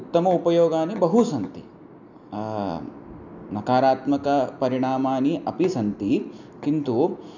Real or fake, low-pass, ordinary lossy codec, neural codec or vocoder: real; 7.2 kHz; none; none